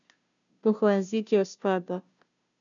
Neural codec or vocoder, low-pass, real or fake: codec, 16 kHz, 0.5 kbps, FunCodec, trained on Chinese and English, 25 frames a second; 7.2 kHz; fake